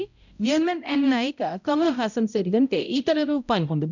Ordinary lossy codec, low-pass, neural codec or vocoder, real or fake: none; 7.2 kHz; codec, 16 kHz, 0.5 kbps, X-Codec, HuBERT features, trained on balanced general audio; fake